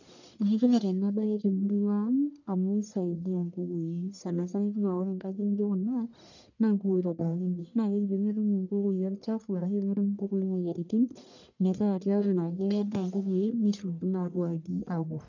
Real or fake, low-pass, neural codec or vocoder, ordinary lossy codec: fake; 7.2 kHz; codec, 44.1 kHz, 1.7 kbps, Pupu-Codec; none